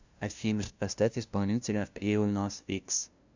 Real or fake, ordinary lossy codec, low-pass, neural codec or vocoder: fake; Opus, 64 kbps; 7.2 kHz; codec, 16 kHz, 0.5 kbps, FunCodec, trained on LibriTTS, 25 frames a second